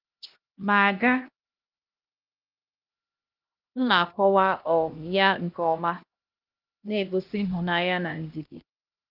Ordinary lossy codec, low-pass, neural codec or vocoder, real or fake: Opus, 32 kbps; 5.4 kHz; codec, 16 kHz, 1 kbps, X-Codec, HuBERT features, trained on LibriSpeech; fake